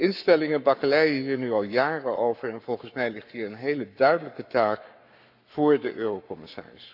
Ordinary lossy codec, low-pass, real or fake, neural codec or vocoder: none; 5.4 kHz; fake; codec, 44.1 kHz, 7.8 kbps, Pupu-Codec